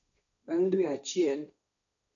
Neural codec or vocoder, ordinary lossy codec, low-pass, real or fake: codec, 16 kHz, 1.1 kbps, Voila-Tokenizer; none; 7.2 kHz; fake